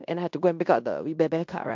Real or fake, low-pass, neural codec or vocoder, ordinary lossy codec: fake; 7.2 kHz; codec, 16 kHz in and 24 kHz out, 0.9 kbps, LongCat-Audio-Codec, fine tuned four codebook decoder; MP3, 64 kbps